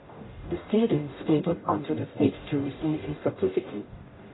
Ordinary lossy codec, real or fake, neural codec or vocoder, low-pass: AAC, 16 kbps; fake; codec, 44.1 kHz, 0.9 kbps, DAC; 7.2 kHz